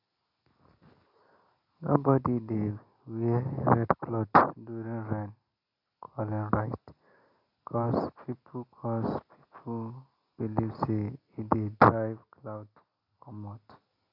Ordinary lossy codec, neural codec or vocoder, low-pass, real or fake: none; none; 5.4 kHz; real